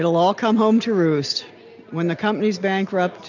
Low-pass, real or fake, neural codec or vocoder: 7.2 kHz; real; none